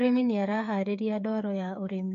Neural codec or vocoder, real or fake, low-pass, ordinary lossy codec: codec, 16 kHz, 8 kbps, FreqCodec, smaller model; fake; 7.2 kHz; none